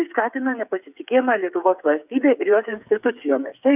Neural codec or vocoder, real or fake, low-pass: codec, 16 kHz, 8 kbps, FreqCodec, smaller model; fake; 3.6 kHz